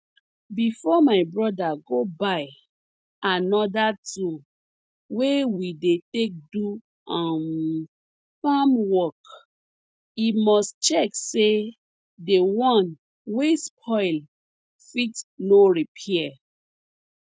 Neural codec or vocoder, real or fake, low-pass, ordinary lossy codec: none; real; none; none